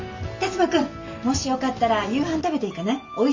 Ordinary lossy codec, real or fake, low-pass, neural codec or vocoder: MP3, 64 kbps; real; 7.2 kHz; none